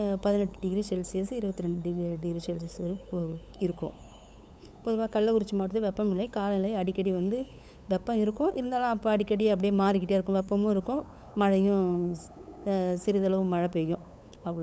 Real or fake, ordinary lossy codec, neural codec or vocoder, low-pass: fake; none; codec, 16 kHz, 8 kbps, FunCodec, trained on LibriTTS, 25 frames a second; none